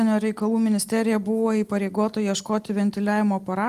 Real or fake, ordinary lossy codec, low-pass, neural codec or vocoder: real; Opus, 24 kbps; 14.4 kHz; none